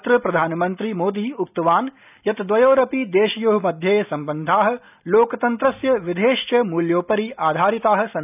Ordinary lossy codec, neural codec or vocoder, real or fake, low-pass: none; none; real; 3.6 kHz